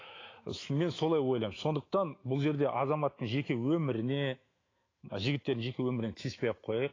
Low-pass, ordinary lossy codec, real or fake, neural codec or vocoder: 7.2 kHz; AAC, 32 kbps; fake; autoencoder, 48 kHz, 128 numbers a frame, DAC-VAE, trained on Japanese speech